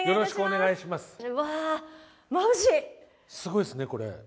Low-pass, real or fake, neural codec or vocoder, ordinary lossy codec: none; real; none; none